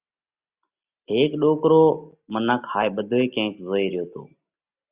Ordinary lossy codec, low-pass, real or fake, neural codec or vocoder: Opus, 64 kbps; 3.6 kHz; real; none